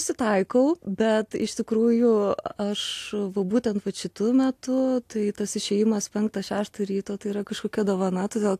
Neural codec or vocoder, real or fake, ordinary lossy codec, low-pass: none; real; AAC, 64 kbps; 14.4 kHz